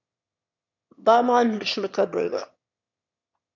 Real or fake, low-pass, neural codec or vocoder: fake; 7.2 kHz; autoencoder, 22.05 kHz, a latent of 192 numbers a frame, VITS, trained on one speaker